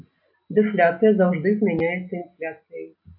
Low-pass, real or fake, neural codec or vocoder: 5.4 kHz; real; none